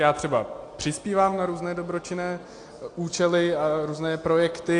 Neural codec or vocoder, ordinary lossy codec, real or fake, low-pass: none; AAC, 48 kbps; real; 9.9 kHz